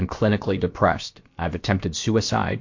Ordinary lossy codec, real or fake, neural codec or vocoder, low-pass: MP3, 48 kbps; fake; codec, 16 kHz, about 1 kbps, DyCAST, with the encoder's durations; 7.2 kHz